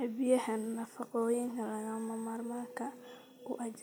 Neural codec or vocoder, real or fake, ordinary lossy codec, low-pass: none; real; none; none